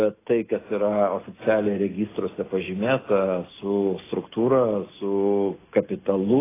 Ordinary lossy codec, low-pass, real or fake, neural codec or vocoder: AAC, 16 kbps; 3.6 kHz; real; none